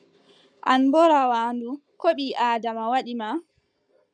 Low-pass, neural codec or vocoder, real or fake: 9.9 kHz; codec, 44.1 kHz, 7.8 kbps, Pupu-Codec; fake